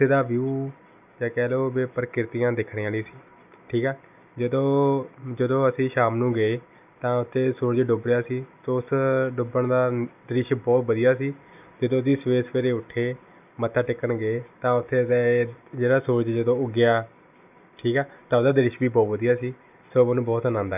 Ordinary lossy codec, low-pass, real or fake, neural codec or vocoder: none; 3.6 kHz; real; none